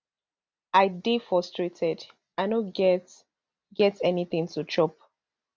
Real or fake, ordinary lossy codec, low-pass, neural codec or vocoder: real; none; none; none